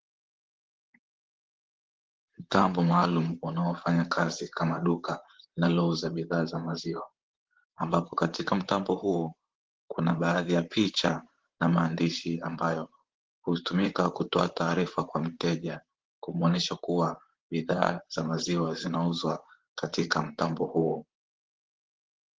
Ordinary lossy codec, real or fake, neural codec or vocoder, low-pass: Opus, 16 kbps; fake; vocoder, 44.1 kHz, 128 mel bands, Pupu-Vocoder; 7.2 kHz